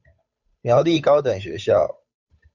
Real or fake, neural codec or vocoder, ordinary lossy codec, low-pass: fake; codec, 16 kHz, 8 kbps, FunCodec, trained on Chinese and English, 25 frames a second; Opus, 64 kbps; 7.2 kHz